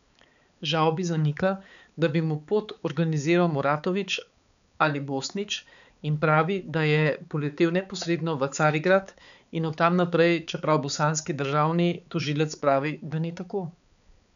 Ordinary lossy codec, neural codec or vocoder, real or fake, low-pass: none; codec, 16 kHz, 4 kbps, X-Codec, HuBERT features, trained on balanced general audio; fake; 7.2 kHz